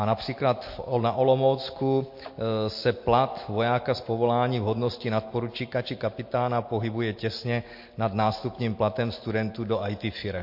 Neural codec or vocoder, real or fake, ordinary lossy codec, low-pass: none; real; MP3, 32 kbps; 5.4 kHz